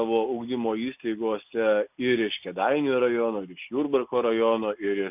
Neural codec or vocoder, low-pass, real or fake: none; 3.6 kHz; real